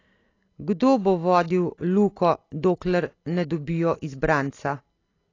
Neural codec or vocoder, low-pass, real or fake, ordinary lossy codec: none; 7.2 kHz; real; AAC, 32 kbps